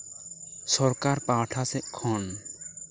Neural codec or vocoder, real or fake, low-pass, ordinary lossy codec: none; real; none; none